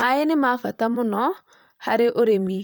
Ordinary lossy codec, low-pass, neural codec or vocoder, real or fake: none; none; vocoder, 44.1 kHz, 128 mel bands, Pupu-Vocoder; fake